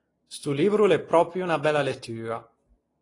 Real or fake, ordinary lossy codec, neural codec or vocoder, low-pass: real; AAC, 32 kbps; none; 10.8 kHz